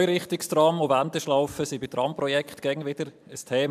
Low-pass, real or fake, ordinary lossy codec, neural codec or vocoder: 14.4 kHz; real; MP3, 96 kbps; none